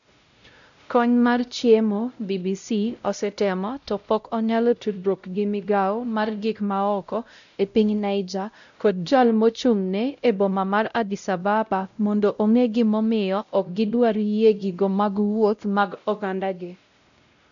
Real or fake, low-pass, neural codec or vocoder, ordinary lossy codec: fake; 7.2 kHz; codec, 16 kHz, 0.5 kbps, X-Codec, WavLM features, trained on Multilingual LibriSpeech; none